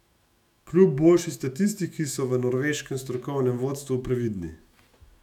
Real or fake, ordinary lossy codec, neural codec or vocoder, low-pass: fake; none; autoencoder, 48 kHz, 128 numbers a frame, DAC-VAE, trained on Japanese speech; 19.8 kHz